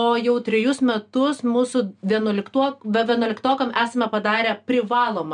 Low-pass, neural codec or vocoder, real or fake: 9.9 kHz; none; real